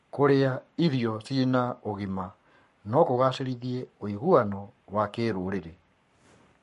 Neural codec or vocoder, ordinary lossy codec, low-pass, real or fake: codec, 44.1 kHz, 7.8 kbps, Pupu-Codec; MP3, 48 kbps; 14.4 kHz; fake